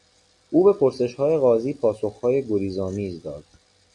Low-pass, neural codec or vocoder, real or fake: 10.8 kHz; none; real